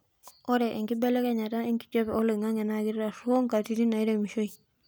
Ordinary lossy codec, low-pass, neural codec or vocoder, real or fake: none; none; none; real